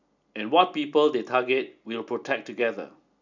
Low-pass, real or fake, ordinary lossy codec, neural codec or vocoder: 7.2 kHz; real; none; none